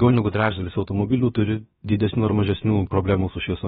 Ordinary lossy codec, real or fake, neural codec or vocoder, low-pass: AAC, 16 kbps; fake; codec, 16 kHz, about 1 kbps, DyCAST, with the encoder's durations; 7.2 kHz